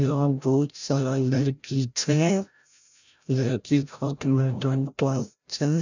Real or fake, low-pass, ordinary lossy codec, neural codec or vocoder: fake; 7.2 kHz; none; codec, 16 kHz, 0.5 kbps, FreqCodec, larger model